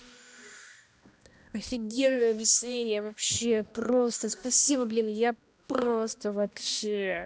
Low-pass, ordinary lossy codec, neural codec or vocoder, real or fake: none; none; codec, 16 kHz, 1 kbps, X-Codec, HuBERT features, trained on balanced general audio; fake